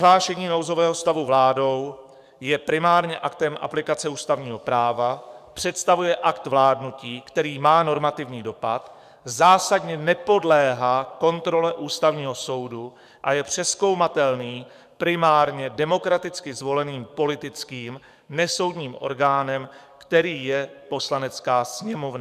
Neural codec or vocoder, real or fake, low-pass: codec, 44.1 kHz, 7.8 kbps, DAC; fake; 14.4 kHz